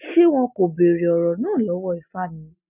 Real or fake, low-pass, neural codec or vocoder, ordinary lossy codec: real; 3.6 kHz; none; none